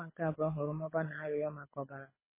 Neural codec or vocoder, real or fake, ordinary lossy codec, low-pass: codec, 24 kHz, 6 kbps, HILCodec; fake; MP3, 16 kbps; 3.6 kHz